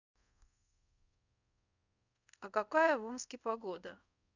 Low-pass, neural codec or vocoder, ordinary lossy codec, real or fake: 7.2 kHz; codec, 24 kHz, 0.5 kbps, DualCodec; none; fake